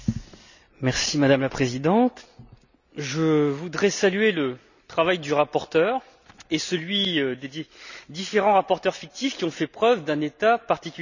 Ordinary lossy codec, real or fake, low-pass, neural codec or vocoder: none; real; 7.2 kHz; none